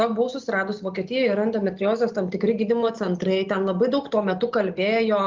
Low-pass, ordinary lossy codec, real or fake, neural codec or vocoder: 7.2 kHz; Opus, 32 kbps; real; none